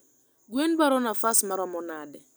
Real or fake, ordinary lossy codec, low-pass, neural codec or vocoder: real; none; none; none